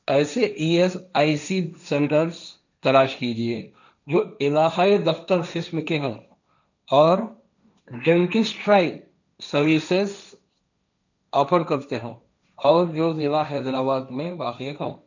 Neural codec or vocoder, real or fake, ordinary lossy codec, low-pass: codec, 16 kHz, 1.1 kbps, Voila-Tokenizer; fake; none; 7.2 kHz